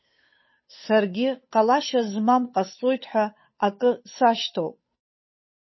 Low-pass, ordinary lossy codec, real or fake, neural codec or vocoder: 7.2 kHz; MP3, 24 kbps; fake; codec, 16 kHz, 8 kbps, FunCodec, trained on Chinese and English, 25 frames a second